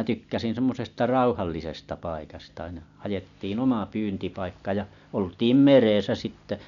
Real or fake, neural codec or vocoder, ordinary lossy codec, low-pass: real; none; none; 7.2 kHz